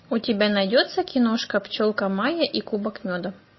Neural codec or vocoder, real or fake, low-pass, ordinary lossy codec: none; real; 7.2 kHz; MP3, 24 kbps